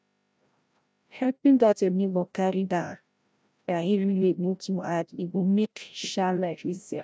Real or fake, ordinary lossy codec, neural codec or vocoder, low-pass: fake; none; codec, 16 kHz, 0.5 kbps, FreqCodec, larger model; none